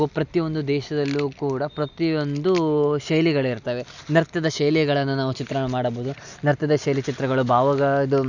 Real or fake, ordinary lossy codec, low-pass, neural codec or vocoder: real; none; 7.2 kHz; none